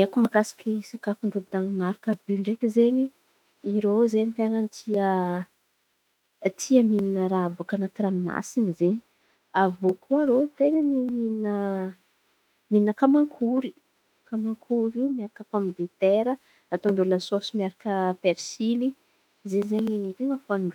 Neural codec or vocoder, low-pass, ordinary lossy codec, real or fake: autoencoder, 48 kHz, 32 numbers a frame, DAC-VAE, trained on Japanese speech; 19.8 kHz; none; fake